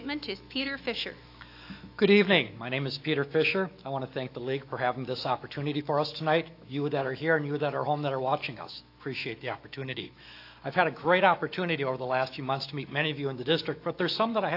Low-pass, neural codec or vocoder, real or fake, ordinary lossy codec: 5.4 kHz; autoencoder, 48 kHz, 128 numbers a frame, DAC-VAE, trained on Japanese speech; fake; AAC, 32 kbps